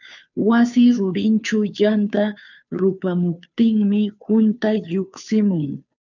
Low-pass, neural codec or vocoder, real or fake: 7.2 kHz; codec, 16 kHz, 2 kbps, FunCodec, trained on Chinese and English, 25 frames a second; fake